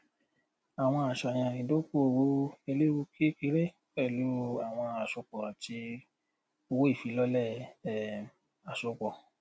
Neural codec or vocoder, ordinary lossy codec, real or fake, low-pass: none; none; real; none